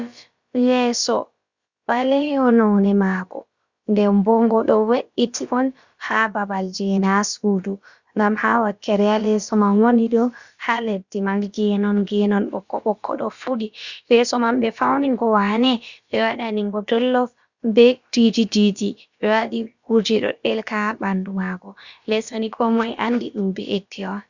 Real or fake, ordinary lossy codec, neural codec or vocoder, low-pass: fake; Opus, 64 kbps; codec, 16 kHz, about 1 kbps, DyCAST, with the encoder's durations; 7.2 kHz